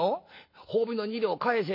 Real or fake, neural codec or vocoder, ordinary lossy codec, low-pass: real; none; MP3, 32 kbps; 5.4 kHz